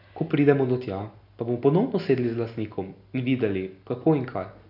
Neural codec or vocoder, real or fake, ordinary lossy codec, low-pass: none; real; none; 5.4 kHz